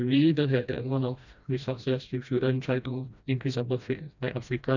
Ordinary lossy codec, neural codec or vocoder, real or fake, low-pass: none; codec, 16 kHz, 1 kbps, FreqCodec, smaller model; fake; 7.2 kHz